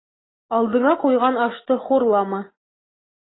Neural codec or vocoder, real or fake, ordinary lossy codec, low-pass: none; real; AAC, 16 kbps; 7.2 kHz